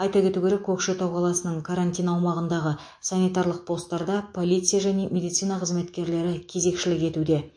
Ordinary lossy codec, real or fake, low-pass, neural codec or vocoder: MP3, 48 kbps; fake; 9.9 kHz; autoencoder, 48 kHz, 128 numbers a frame, DAC-VAE, trained on Japanese speech